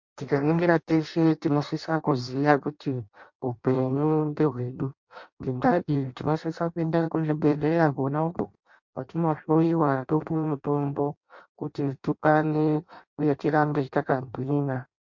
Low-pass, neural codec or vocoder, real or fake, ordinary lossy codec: 7.2 kHz; codec, 16 kHz in and 24 kHz out, 0.6 kbps, FireRedTTS-2 codec; fake; MP3, 48 kbps